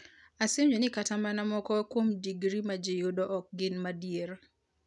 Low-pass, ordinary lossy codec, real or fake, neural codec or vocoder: 10.8 kHz; none; real; none